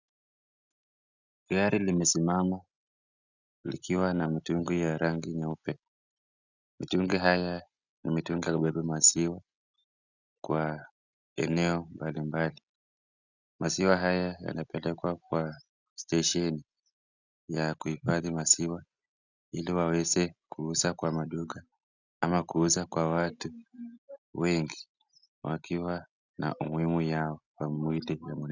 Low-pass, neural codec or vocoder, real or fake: 7.2 kHz; none; real